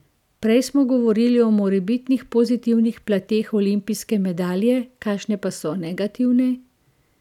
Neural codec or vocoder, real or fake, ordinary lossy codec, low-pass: none; real; none; 19.8 kHz